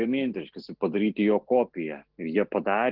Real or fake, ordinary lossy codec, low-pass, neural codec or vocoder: real; Opus, 16 kbps; 5.4 kHz; none